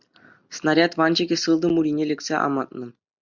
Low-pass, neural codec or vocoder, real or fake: 7.2 kHz; none; real